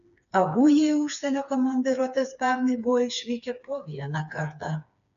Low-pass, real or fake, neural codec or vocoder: 7.2 kHz; fake; codec, 16 kHz, 4 kbps, FreqCodec, smaller model